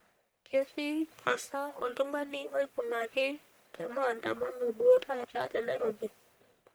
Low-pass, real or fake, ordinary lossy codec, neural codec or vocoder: none; fake; none; codec, 44.1 kHz, 1.7 kbps, Pupu-Codec